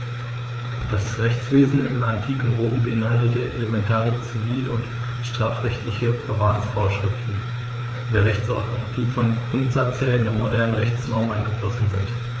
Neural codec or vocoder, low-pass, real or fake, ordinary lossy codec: codec, 16 kHz, 4 kbps, FreqCodec, larger model; none; fake; none